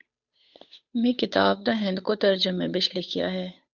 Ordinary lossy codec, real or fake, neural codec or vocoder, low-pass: Opus, 64 kbps; fake; codec, 16 kHz, 8 kbps, FunCodec, trained on Chinese and English, 25 frames a second; 7.2 kHz